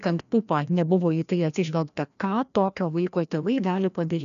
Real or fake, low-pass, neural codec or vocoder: fake; 7.2 kHz; codec, 16 kHz, 1 kbps, FreqCodec, larger model